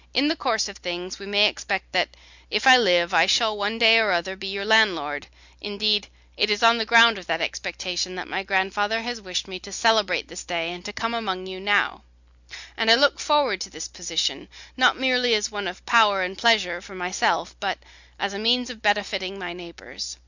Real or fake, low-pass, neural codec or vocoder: real; 7.2 kHz; none